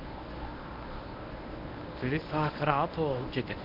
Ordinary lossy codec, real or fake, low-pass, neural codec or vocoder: none; fake; 5.4 kHz; codec, 24 kHz, 0.9 kbps, WavTokenizer, medium speech release version 1